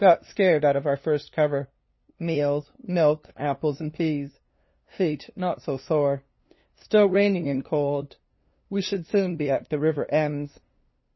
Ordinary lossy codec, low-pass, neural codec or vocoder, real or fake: MP3, 24 kbps; 7.2 kHz; codec, 16 kHz, 2 kbps, FunCodec, trained on LibriTTS, 25 frames a second; fake